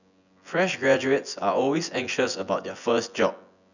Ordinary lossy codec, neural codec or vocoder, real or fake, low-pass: none; vocoder, 24 kHz, 100 mel bands, Vocos; fake; 7.2 kHz